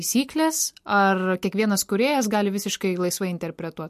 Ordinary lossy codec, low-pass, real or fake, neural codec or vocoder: MP3, 64 kbps; 14.4 kHz; real; none